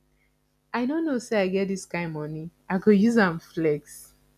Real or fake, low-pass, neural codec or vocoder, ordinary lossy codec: real; 14.4 kHz; none; none